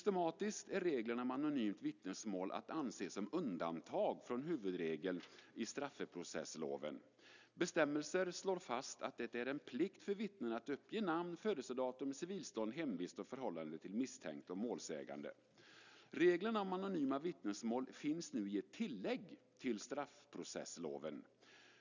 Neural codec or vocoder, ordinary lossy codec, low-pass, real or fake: none; none; 7.2 kHz; real